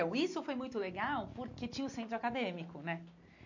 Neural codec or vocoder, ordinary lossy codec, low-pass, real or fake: none; MP3, 48 kbps; 7.2 kHz; real